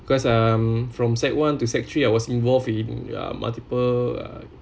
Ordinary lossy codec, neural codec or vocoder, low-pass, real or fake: none; none; none; real